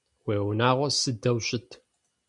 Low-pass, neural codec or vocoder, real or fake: 10.8 kHz; none; real